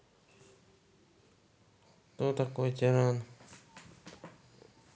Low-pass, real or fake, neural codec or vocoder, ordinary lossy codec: none; real; none; none